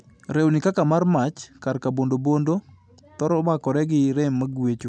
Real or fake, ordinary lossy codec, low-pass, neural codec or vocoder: real; none; none; none